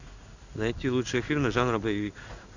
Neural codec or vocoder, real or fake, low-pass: codec, 16 kHz in and 24 kHz out, 1 kbps, XY-Tokenizer; fake; 7.2 kHz